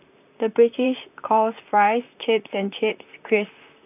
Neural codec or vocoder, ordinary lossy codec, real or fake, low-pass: vocoder, 44.1 kHz, 128 mel bands, Pupu-Vocoder; none; fake; 3.6 kHz